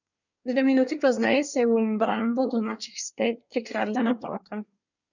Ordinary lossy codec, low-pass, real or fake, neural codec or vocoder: none; 7.2 kHz; fake; codec, 24 kHz, 1 kbps, SNAC